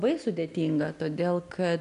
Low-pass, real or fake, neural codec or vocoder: 10.8 kHz; real; none